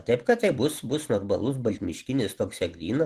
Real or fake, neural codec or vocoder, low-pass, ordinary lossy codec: real; none; 14.4 kHz; Opus, 16 kbps